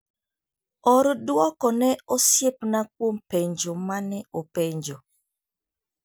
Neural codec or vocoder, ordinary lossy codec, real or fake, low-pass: none; none; real; none